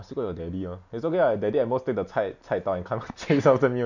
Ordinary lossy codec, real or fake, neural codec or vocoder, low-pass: none; real; none; 7.2 kHz